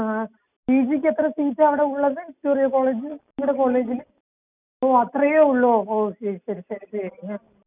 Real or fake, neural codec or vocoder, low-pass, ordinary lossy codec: real; none; 3.6 kHz; none